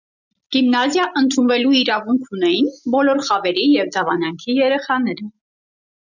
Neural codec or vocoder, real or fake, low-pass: none; real; 7.2 kHz